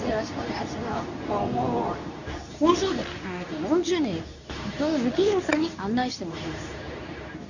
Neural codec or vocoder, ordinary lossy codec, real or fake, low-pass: codec, 24 kHz, 0.9 kbps, WavTokenizer, medium speech release version 2; none; fake; 7.2 kHz